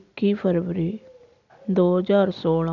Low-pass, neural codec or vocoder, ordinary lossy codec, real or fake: 7.2 kHz; none; none; real